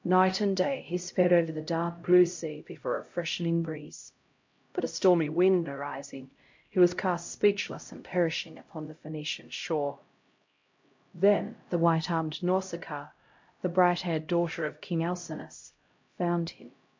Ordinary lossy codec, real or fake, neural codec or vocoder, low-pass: MP3, 48 kbps; fake; codec, 16 kHz, 0.5 kbps, X-Codec, HuBERT features, trained on LibriSpeech; 7.2 kHz